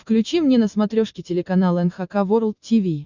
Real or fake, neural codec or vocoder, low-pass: real; none; 7.2 kHz